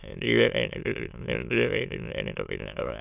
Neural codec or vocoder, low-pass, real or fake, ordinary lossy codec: autoencoder, 22.05 kHz, a latent of 192 numbers a frame, VITS, trained on many speakers; 3.6 kHz; fake; none